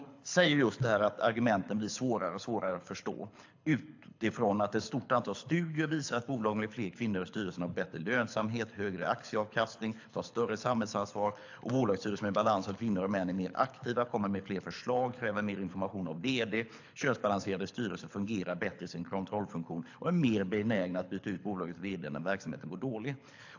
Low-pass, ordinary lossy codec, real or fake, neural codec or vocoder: 7.2 kHz; AAC, 48 kbps; fake; codec, 24 kHz, 6 kbps, HILCodec